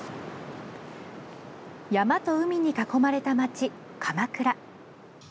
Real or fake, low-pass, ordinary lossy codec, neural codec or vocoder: real; none; none; none